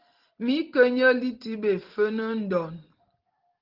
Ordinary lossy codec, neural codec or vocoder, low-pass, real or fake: Opus, 16 kbps; none; 5.4 kHz; real